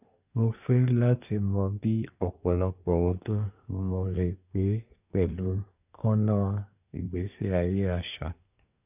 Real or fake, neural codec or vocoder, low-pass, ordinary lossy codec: fake; codec, 24 kHz, 1 kbps, SNAC; 3.6 kHz; none